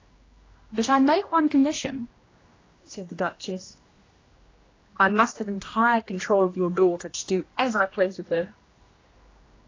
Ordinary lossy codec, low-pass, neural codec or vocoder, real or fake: AAC, 32 kbps; 7.2 kHz; codec, 16 kHz, 1 kbps, X-Codec, HuBERT features, trained on general audio; fake